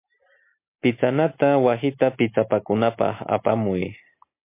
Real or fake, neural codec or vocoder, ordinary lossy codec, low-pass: real; none; MP3, 24 kbps; 3.6 kHz